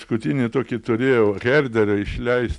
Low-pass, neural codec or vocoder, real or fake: 10.8 kHz; none; real